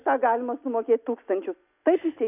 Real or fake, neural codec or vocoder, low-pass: real; none; 3.6 kHz